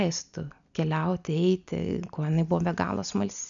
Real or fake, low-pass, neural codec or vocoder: real; 7.2 kHz; none